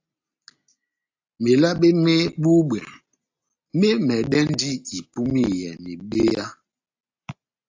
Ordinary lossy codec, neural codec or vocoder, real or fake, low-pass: AAC, 48 kbps; none; real; 7.2 kHz